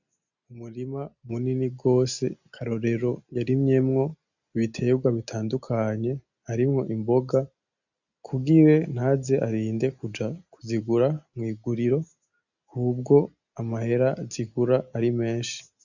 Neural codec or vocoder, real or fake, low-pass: none; real; 7.2 kHz